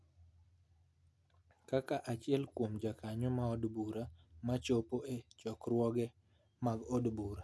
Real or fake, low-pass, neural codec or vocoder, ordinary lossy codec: real; none; none; none